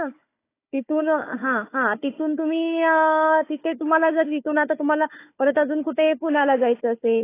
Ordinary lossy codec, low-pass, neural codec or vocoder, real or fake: AAC, 24 kbps; 3.6 kHz; codec, 16 kHz, 4 kbps, FunCodec, trained on Chinese and English, 50 frames a second; fake